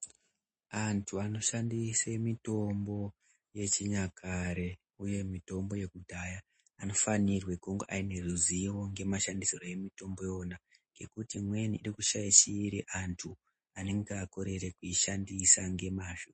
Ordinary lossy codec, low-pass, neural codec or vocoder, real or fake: MP3, 32 kbps; 9.9 kHz; none; real